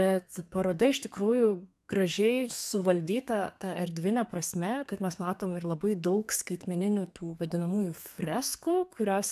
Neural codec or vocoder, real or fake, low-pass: codec, 32 kHz, 1.9 kbps, SNAC; fake; 14.4 kHz